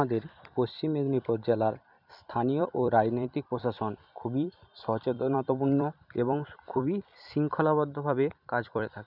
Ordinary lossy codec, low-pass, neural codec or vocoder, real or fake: none; 5.4 kHz; vocoder, 44.1 kHz, 128 mel bands every 256 samples, BigVGAN v2; fake